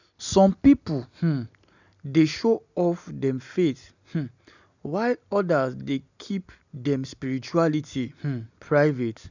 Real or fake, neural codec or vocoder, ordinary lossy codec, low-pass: real; none; none; 7.2 kHz